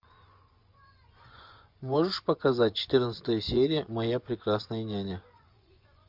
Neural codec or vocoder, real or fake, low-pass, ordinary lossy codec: vocoder, 24 kHz, 100 mel bands, Vocos; fake; 5.4 kHz; MP3, 48 kbps